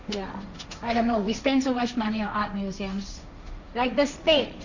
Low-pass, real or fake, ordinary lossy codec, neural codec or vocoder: 7.2 kHz; fake; none; codec, 16 kHz, 1.1 kbps, Voila-Tokenizer